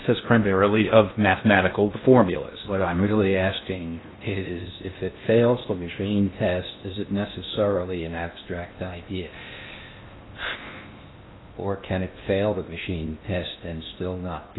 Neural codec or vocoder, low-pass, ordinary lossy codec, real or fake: codec, 16 kHz in and 24 kHz out, 0.6 kbps, FocalCodec, streaming, 2048 codes; 7.2 kHz; AAC, 16 kbps; fake